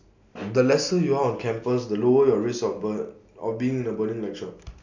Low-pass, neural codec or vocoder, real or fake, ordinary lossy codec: 7.2 kHz; none; real; none